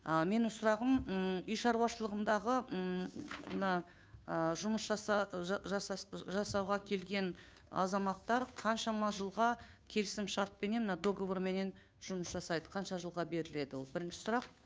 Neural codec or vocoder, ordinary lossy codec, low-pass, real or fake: codec, 16 kHz, 2 kbps, FunCodec, trained on Chinese and English, 25 frames a second; none; none; fake